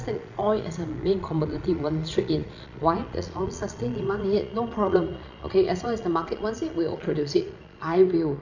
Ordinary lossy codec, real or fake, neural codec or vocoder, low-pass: none; fake; vocoder, 22.05 kHz, 80 mel bands, Vocos; 7.2 kHz